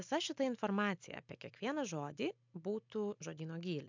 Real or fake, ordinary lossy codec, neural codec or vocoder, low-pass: real; MP3, 64 kbps; none; 7.2 kHz